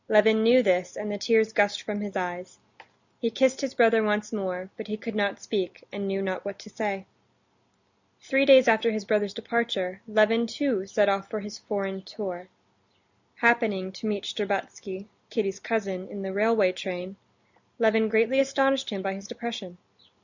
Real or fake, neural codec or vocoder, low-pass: real; none; 7.2 kHz